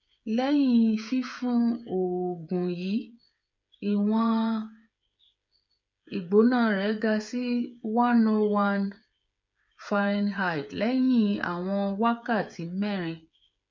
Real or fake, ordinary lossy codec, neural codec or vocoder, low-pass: fake; MP3, 64 kbps; codec, 16 kHz, 8 kbps, FreqCodec, smaller model; 7.2 kHz